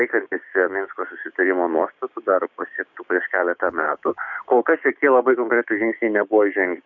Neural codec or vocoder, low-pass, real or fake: codec, 16 kHz, 6 kbps, DAC; 7.2 kHz; fake